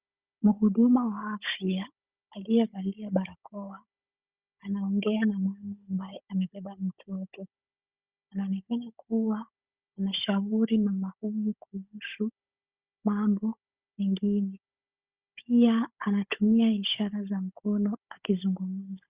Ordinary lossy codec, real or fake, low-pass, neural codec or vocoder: Opus, 64 kbps; fake; 3.6 kHz; codec, 16 kHz, 16 kbps, FunCodec, trained on Chinese and English, 50 frames a second